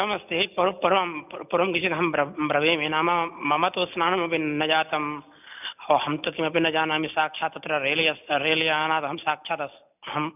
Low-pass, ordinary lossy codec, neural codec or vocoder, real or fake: 3.6 kHz; none; none; real